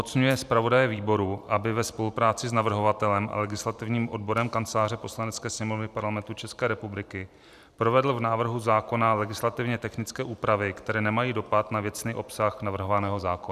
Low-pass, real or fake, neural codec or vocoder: 14.4 kHz; fake; vocoder, 44.1 kHz, 128 mel bands every 512 samples, BigVGAN v2